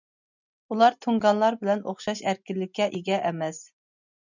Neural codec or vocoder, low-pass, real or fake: none; 7.2 kHz; real